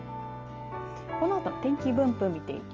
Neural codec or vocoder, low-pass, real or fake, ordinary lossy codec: none; 7.2 kHz; real; Opus, 24 kbps